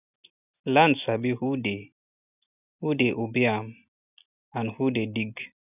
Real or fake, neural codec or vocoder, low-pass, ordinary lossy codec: real; none; 3.6 kHz; none